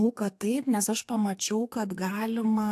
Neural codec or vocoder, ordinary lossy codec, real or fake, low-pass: codec, 32 kHz, 1.9 kbps, SNAC; AAC, 64 kbps; fake; 14.4 kHz